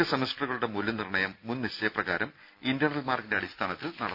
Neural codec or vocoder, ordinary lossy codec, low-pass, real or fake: none; none; 5.4 kHz; real